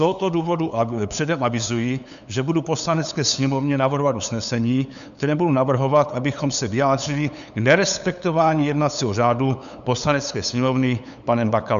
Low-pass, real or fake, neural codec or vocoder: 7.2 kHz; fake; codec, 16 kHz, 8 kbps, FunCodec, trained on LibriTTS, 25 frames a second